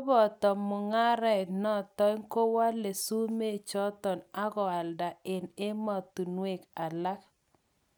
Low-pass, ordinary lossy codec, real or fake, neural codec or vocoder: none; none; real; none